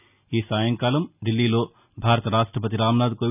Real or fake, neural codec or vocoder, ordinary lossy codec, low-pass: real; none; none; 3.6 kHz